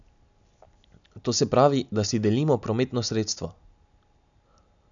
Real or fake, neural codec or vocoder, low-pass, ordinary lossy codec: real; none; 7.2 kHz; none